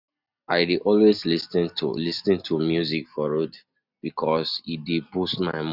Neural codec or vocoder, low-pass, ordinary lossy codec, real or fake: none; 5.4 kHz; none; real